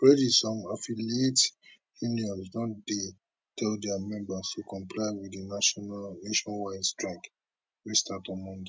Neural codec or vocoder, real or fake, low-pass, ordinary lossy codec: none; real; none; none